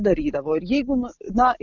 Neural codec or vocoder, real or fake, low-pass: none; real; 7.2 kHz